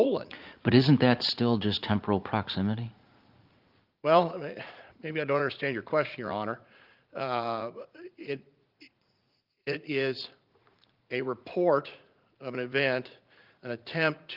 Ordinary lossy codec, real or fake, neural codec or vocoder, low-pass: Opus, 32 kbps; fake; vocoder, 44.1 kHz, 80 mel bands, Vocos; 5.4 kHz